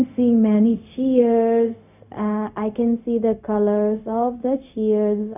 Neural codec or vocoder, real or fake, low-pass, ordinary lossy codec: codec, 16 kHz, 0.4 kbps, LongCat-Audio-Codec; fake; 3.6 kHz; none